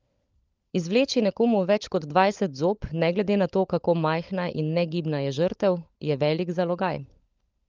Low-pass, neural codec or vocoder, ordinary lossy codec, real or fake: 7.2 kHz; codec, 16 kHz, 16 kbps, FunCodec, trained on LibriTTS, 50 frames a second; Opus, 24 kbps; fake